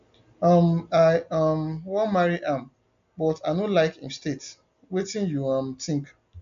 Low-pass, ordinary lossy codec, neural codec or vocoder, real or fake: 7.2 kHz; AAC, 96 kbps; none; real